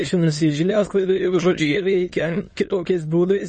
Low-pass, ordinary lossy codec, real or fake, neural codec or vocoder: 9.9 kHz; MP3, 32 kbps; fake; autoencoder, 22.05 kHz, a latent of 192 numbers a frame, VITS, trained on many speakers